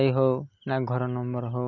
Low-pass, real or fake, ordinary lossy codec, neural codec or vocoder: 7.2 kHz; real; none; none